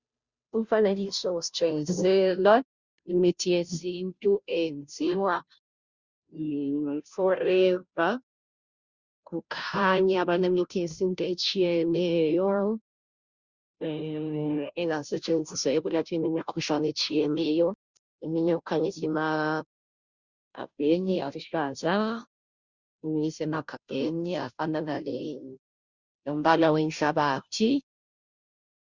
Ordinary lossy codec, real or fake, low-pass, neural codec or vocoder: Opus, 64 kbps; fake; 7.2 kHz; codec, 16 kHz, 0.5 kbps, FunCodec, trained on Chinese and English, 25 frames a second